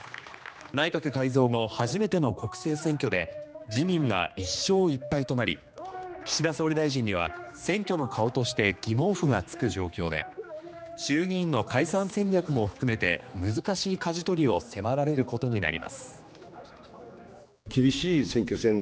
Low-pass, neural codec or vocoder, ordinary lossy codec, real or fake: none; codec, 16 kHz, 2 kbps, X-Codec, HuBERT features, trained on general audio; none; fake